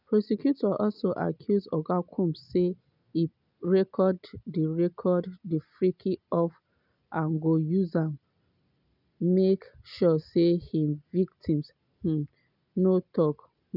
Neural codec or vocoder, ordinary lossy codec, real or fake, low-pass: none; none; real; 5.4 kHz